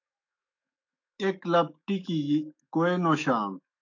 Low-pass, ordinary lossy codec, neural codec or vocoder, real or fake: 7.2 kHz; AAC, 48 kbps; autoencoder, 48 kHz, 128 numbers a frame, DAC-VAE, trained on Japanese speech; fake